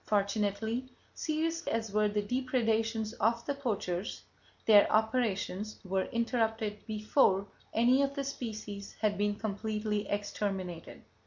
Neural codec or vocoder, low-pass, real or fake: none; 7.2 kHz; real